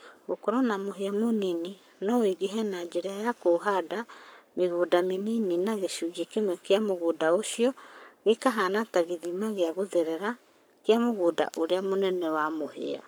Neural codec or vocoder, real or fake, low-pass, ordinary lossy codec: codec, 44.1 kHz, 7.8 kbps, Pupu-Codec; fake; none; none